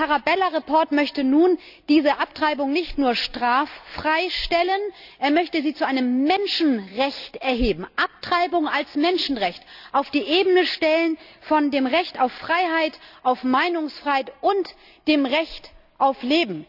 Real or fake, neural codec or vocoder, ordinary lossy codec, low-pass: real; none; none; 5.4 kHz